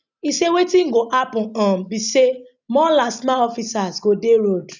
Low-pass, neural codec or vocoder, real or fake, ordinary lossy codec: 7.2 kHz; none; real; none